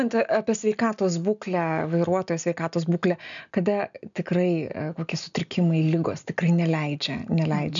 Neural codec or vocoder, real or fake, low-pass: none; real; 7.2 kHz